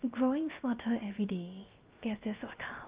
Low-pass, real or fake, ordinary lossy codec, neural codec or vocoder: 3.6 kHz; fake; Opus, 32 kbps; codec, 16 kHz, 0.7 kbps, FocalCodec